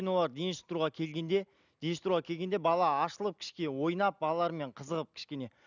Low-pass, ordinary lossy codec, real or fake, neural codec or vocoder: 7.2 kHz; none; real; none